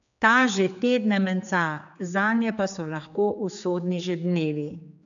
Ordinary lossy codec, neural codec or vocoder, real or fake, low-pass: none; codec, 16 kHz, 4 kbps, X-Codec, HuBERT features, trained on general audio; fake; 7.2 kHz